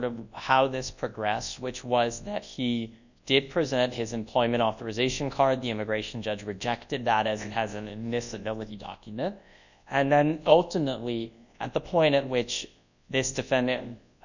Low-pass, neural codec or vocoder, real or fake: 7.2 kHz; codec, 24 kHz, 0.9 kbps, WavTokenizer, large speech release; fake